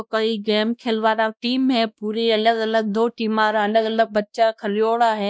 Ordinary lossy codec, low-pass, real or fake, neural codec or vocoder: none; none; fake; codec, 16 kHz, 1 kbps, X-Codec, WavLM features, trained on Multilingual LibriSpeech